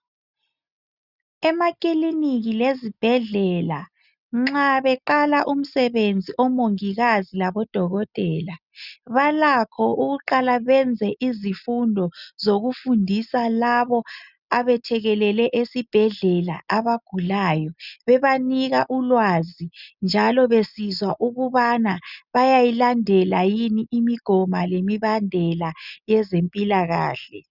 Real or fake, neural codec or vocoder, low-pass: real; none; 5.4 kHz